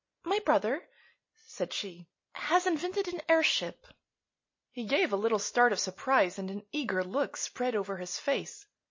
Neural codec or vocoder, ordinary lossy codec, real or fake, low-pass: none; MP3, 32 kbps; real; 7.2 kHz